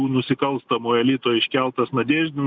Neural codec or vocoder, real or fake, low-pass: none; real; 7.2 kHz